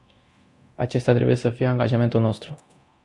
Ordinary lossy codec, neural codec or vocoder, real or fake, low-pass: AAC, 64 kbps; codec, 24 kHz, 0.9 kbps, DualCodec; fake; 10.8 kHz